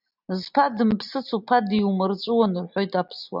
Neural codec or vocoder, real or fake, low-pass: none; real; 5.4 kHz